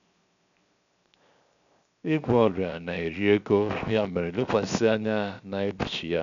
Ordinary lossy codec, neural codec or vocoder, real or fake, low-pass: none; codec, 16 kHz, 0.7 kbps, FocalCodec; fake; 7.2 kHz